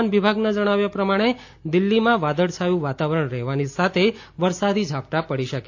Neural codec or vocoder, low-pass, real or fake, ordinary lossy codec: none; 7.2 kHz; real; AAC, 48 kbps